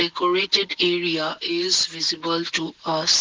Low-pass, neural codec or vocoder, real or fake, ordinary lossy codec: 7.2 kHz; none; real; Opus, 16 kbps